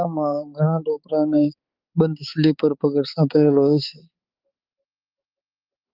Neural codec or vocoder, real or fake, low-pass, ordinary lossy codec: codec, 16 kHz, 4 kbps, X-Codec, HuBERT features, trained on balanced general audio; fake; 5.4 kHz; Opus, 32 kbps